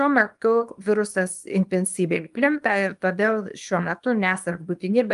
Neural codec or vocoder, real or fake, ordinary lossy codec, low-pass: codec, 24 kHz, 0.9 kbps, WavTokenizer, small release; fake; Opus, 32 kbps; 10.8 kHz